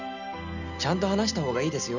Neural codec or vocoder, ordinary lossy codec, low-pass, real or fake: none; none; 7.2 kHz; real